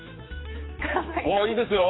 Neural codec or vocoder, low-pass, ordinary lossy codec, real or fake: codec, 16 kHz, 4 kbps, X-Codec, HuBERT features, trained on general audio; 7.2 kHz; AAC, 16 kbps; fake